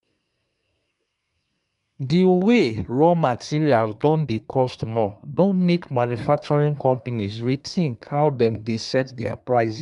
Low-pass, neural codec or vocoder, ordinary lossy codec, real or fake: 10.8 kHz; codec, 24 kHz, 1 kbps, SNAC; none; fake